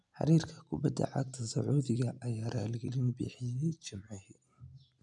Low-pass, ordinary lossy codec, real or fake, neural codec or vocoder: 10.8 kHz; none; real; none